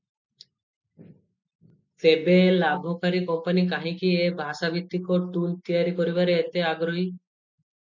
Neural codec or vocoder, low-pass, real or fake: none; 7.2 kHz; real